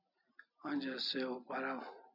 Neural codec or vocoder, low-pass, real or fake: none; 5.4 kHz; real